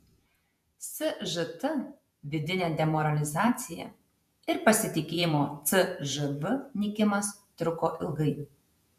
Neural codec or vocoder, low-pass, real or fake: vocoder, 48 kHz, 128 mel bands, Vocos; 14.4 kHz; fake